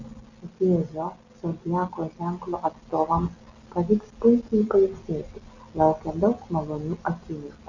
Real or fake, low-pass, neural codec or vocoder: real; 7.2 kHz; none